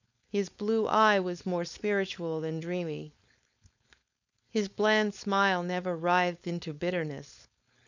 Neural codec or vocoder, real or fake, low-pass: codec, 16 kHz, 4.8 kbps, FACodec; fake; 7.2 kHz